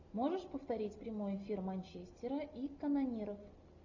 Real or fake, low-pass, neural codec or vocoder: real; 7.2 kHz; none